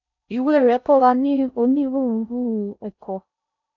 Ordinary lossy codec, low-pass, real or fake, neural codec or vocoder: none; 7.2 kHz; fake; codec, 16 kHz in and 24 kHz out, 0.6 kbps, FocalCodec, streaming, 4096 codes